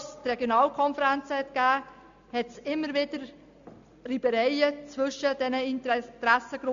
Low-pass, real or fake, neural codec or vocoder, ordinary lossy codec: 7.2 kHz; real; none; AAC, 64 kbps